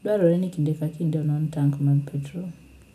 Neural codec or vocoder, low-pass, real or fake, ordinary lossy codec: none; 14.4 kHz; real; none